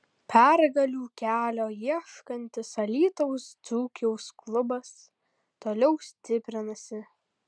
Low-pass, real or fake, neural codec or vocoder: 9.9 kHz; real; none